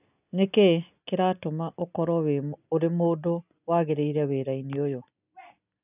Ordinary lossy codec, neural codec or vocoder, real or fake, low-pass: none; none; real; 3.6 kHz